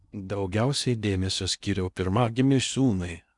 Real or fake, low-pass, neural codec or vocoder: fake; 10.8 kHz; codec, 16 kHz in and 24 kHz out, 0.8 kbps, FocalCodec, streaming, 65536 codes